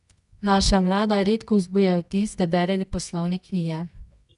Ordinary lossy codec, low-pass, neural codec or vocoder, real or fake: none; 10.8 kHz; codec, 24 kHz, 0.9 kbps, WavTokenizer, medium music audio release; fake